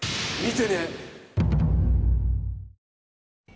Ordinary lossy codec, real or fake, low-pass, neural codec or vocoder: none; real; none; none